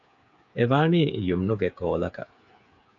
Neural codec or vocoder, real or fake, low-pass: codec, 16 kHz, 8 kbps, FreqCodec, smaller model; fake; 7.2 kHz